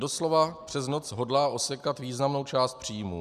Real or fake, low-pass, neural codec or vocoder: real; 14.4 kHz; none